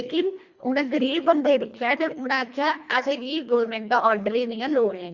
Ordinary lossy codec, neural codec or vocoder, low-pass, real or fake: Opus, 64 kbps; codec, 24 kHz, 1.5 kbps, HILCodec; 7.2 kHz; fake